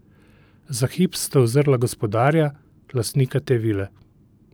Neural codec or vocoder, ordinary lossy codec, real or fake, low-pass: vocoder, 44.1 kHz, 128 mel bands every 512 samples, BigVGAN v2; none; fake; none